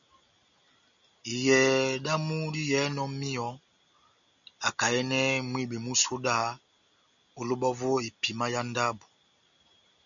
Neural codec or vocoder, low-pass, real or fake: none; 7.2 kHz; real